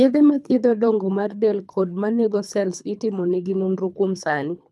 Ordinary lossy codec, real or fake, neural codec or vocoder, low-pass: none; fake; codec, 24 kHz, 3 kbps, HILCodec; none